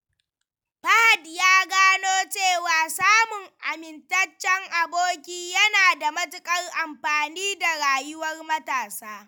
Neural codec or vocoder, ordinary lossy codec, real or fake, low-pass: none; none; real; none